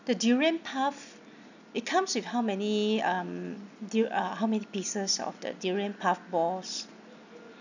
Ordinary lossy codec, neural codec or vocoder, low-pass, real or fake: none; none; 7.2 kHz; real